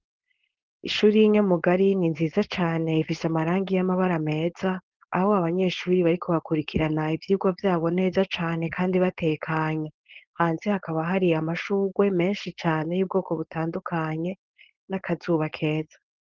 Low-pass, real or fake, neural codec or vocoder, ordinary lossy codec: 7.2 kHz; fake; codec, 16 kHz, 4.8 kbps, FACodec; Opus, 16 kbps